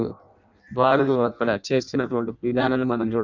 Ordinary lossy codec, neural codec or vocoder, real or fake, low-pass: none; codec, 16 kHz in and 24 kHz out, 0.6 kbps, FireRedTTS-2 codec; fake; 7.2 kHz